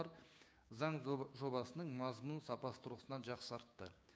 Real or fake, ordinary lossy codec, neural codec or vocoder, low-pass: fake; none; codec, 16 kHz, 6 kbps, DAC; none